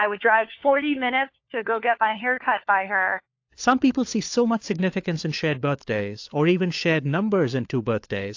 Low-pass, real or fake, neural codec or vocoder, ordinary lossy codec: 7.2 kHz; fake; codec, 16 kHz, 4 kbps, FunCodec, trained on LibriTTS, 50 frames a second; AAC, 48 kbps